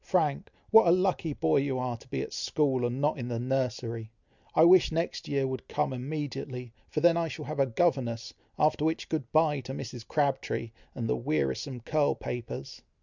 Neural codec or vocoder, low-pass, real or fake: none; 7.2 kHz; real